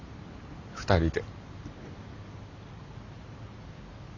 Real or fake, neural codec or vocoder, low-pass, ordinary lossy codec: real; none; 7.2 kHz; none